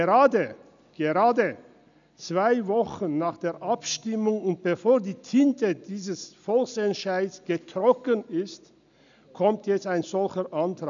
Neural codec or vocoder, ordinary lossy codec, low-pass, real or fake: none; none; 7.2 kHz; real